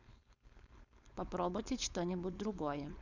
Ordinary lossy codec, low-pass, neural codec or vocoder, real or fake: none; 7.2 kHz; codec, 16 kHz, 4.8 kbps, FACodec; fake